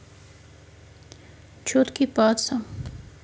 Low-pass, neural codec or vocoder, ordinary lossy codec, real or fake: none; none; none; real